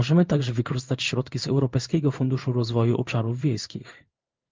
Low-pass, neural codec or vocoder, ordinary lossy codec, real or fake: 7.2 kHz; codec, 16 kHz in and 24 kHz out, 1 kbps, XY-Tokenizer; Opus, 24 kbps; fake